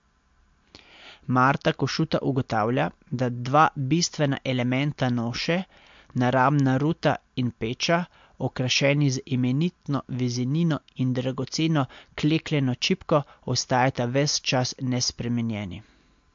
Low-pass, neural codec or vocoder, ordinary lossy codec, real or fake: 7.2 kHz; none; MP3, 48 kbps; real